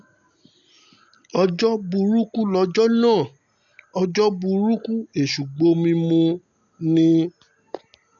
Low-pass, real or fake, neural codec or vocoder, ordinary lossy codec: 7.2 kHz; real; none; none